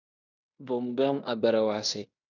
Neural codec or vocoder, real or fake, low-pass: codec, 16 kHz in and 24 kHz out, 0.9 kbps, LongCat-Audio-Codec, fine tuned four codebook decoder; fake; 7.2 kHz